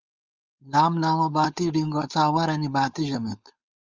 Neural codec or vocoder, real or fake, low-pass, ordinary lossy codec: codec, 16 kHz, 4.8 kbps, FACodec; fake; 7.2 kHz; Opus, 32 kbps